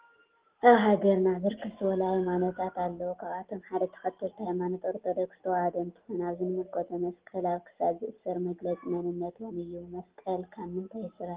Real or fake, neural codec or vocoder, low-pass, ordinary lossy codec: real; none; 3.6 kHz; Opus, 16 kbps